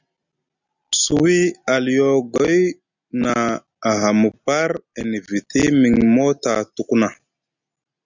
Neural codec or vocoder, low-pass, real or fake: none; 7.2 kHz; real